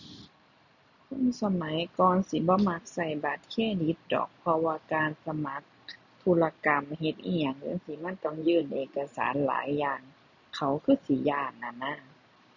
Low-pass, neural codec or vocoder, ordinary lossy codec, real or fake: 7.2 kHz; none; none; real